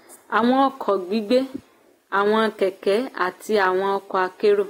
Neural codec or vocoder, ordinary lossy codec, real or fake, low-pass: none; AAC, 48 kbps; real; 19.8 kHz